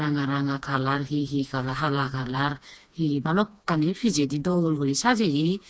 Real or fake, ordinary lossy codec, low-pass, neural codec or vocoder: fake; none; none; codec, 16 kHz, 2 kbps, FreqCodec, smaller model